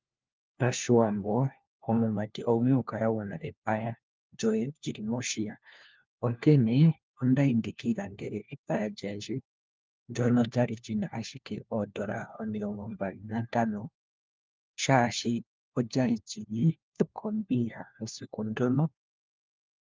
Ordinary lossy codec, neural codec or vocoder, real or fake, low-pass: Opus, 24 kbps; codec, 16 kHz, 1 kbps, FunCodec, trained on LibriTTS, 50 frames a second; fake; 7.2 kHz